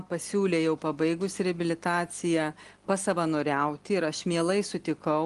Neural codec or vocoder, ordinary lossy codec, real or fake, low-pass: none; Opus, 24 kbps; real; 10.8 kHz